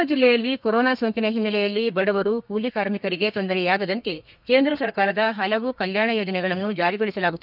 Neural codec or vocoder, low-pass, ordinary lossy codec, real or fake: codec, 32 kHz, 1.9 kbps, SNAC; 5.4 kHz; none; fake